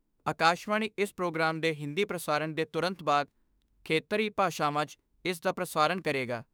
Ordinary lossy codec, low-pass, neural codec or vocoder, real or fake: none; none; autoencoder, 48 kHz, 32 numbers a frame, DAC-VAE, trained on Japanese speech; fake